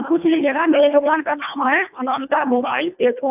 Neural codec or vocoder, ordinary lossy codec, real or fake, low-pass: codec, 24 kHz, 1.5 kbps, HILCodec; none; fake; 3.6 kHz